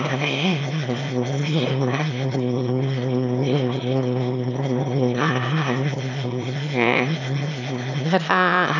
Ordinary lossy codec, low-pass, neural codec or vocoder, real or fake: none; 7.2 kHz; autoencoder, 22.05 kHz, a latent of 192 numbers a frame, VITS, trained on one speaker; fake